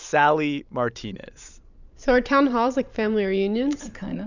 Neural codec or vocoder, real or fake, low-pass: vocoder, 44.1 kHz, 128 mel bands every 512 samples, BigVGAN v2; fake; 7.2 kHz